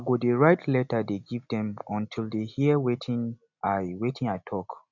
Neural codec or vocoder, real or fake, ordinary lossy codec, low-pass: none; real; none; 7.2 kHz